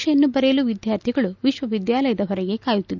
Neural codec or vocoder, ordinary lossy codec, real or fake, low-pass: none; none; real; 7.2 kHz